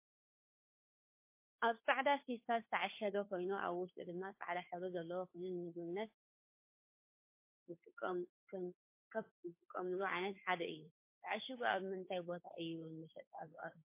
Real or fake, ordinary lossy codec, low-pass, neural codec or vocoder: fake; MP3, 24 kbps; 3.6 kHz; codec, 16 kHz, 2 kbps, FunCodec, trained on Chinese and English, 25 frames a second